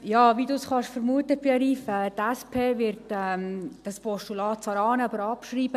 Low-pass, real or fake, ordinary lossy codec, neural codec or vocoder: 14.4 kHz; real; none; none